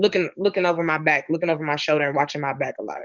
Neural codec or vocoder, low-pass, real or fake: codec, 44.1 kHz, 7.8 kbps, DAC; 7.2 kHz; fake